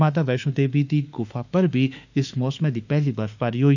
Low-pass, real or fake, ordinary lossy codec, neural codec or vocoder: 7.2 kHz; fake; none; autoencoder, 48 kHz, 32 numbers a frame, DAC-VAE, trained on Japanese speech